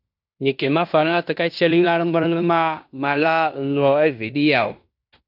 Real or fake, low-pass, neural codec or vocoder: fake; 5.4 kHz; codec, 16 kHz in and 24 kHz out, 0.9 kbps, LongCat-Audio-Codec, fine tuned four codebook decoder